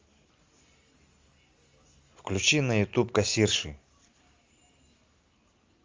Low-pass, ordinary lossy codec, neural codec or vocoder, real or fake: 7.2 kHz; Opus, 32 kbps; none; real